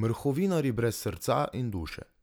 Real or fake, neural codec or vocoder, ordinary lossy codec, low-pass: real; none; none; none